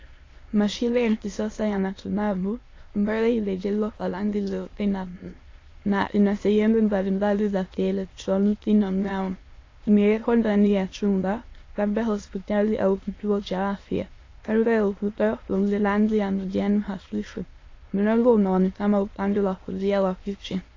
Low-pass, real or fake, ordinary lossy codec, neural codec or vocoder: 7.2 kHz; fake; AAC, 32 kbps; autoencoder, 22.05 kHz, a latent of 192 numbers a frame, VITS, trained on many speakers